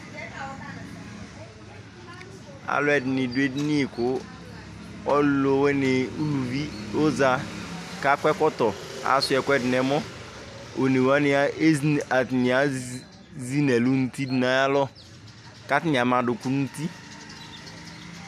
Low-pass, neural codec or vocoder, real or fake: 14.4 kHz; none; real